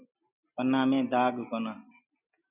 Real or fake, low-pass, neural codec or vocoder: real; 3.6 kHz; none